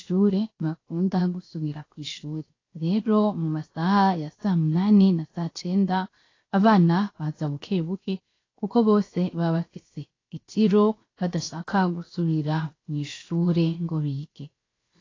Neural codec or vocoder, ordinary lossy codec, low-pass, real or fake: codec, 16 kHz, 0.7 kbps, FocalCodec; AAC, 32 kbps; 7.2 kHz; fake